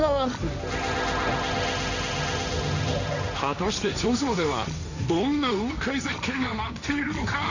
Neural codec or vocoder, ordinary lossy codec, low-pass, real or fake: codec, 16 kHz, 1.1 kbps, Voila-Tokenizer; none; 7.2 kHz; fake